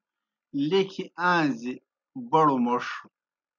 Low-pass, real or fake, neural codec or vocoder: 7.2 kHz; real; none